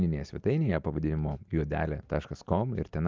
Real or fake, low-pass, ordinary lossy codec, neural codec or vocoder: real; 7.2 kHz; Opus, 32 kbps; none